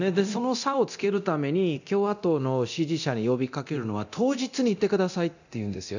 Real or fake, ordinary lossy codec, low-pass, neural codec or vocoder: fake; none; 7.2 kHz; codec, 24 kHz, 0.9 kbps, DualCodec